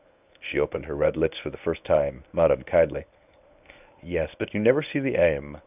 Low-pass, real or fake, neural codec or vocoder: 3.6 kHz; fake; codec, 24 kHz, 0.9 kbps, WavTokenizer, medium speech release version 1